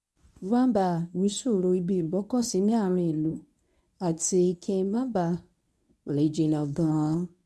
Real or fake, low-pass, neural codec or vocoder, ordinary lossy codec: fake; none; codec, 24 kHz, 0.9 kbps, WavTokenizer, medium speech release version 1; none